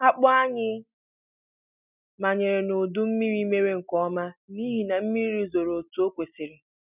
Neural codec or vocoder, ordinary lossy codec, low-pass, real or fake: none; none; 3.6 kHz; real